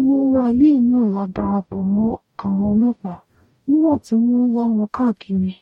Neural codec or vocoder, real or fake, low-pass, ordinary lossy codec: codec, 44.1 kHz, 0.9 kbps, DAC; fake; 19.8 kHz; MP3, 64 kbps